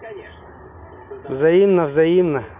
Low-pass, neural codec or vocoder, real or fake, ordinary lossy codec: 3.6 kHz; none; real; none